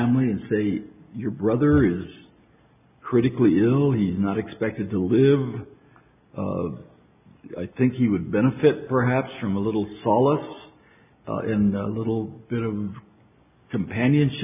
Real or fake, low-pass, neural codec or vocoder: real; 3.6 kHz; none